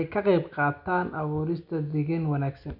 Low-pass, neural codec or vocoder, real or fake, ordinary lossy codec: 5.4 kHz; none; real; none